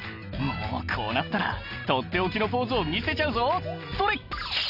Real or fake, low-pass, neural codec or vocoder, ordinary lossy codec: real; 5.4 kHz; none; none